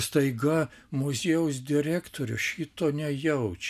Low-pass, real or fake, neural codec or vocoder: 14.4 kHz; real; none